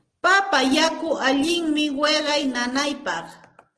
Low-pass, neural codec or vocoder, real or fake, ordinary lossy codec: 9.9 kHz; none; real; Opus, 16 kbps